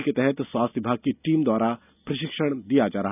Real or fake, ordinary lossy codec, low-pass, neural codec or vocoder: real; none; 3.6 kHz; none